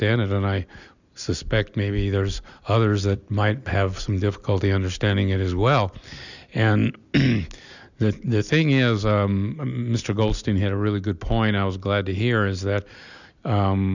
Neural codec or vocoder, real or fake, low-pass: none; real; 7.2 kHz